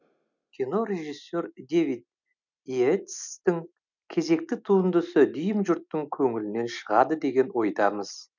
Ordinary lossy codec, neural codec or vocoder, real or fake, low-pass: none; none; real; 7.2 kHz